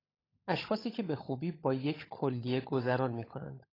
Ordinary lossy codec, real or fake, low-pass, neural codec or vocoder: AAC, 24 kbps; fake; 5.4 kHz; codec, 16 kHz, 16 kbps, FunCodec, trained on LibriTTS, 50 frames a second